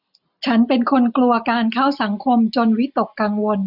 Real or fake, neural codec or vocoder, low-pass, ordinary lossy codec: real; none; 5.4 kHz; none